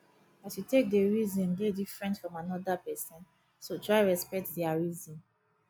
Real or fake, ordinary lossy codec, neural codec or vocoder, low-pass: real; none; none; none